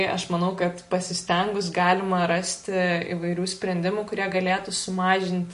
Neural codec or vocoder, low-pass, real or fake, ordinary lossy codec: none; 10.8 kHz; real; MP3, 48 kbps